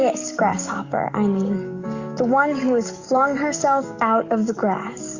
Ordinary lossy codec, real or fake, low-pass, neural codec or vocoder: Opus, 64 kbps; fake; 7.2 kHz; codec, 44.1 kHz, 7.8 kbps, DAC